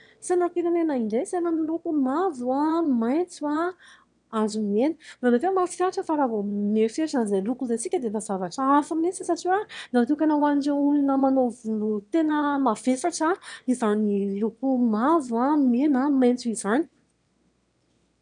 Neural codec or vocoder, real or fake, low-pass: autoencoder, 22.05 kHz, a latent of 192 numbers a frame, VITS, trained on one speaker; fake; 9.9 kHz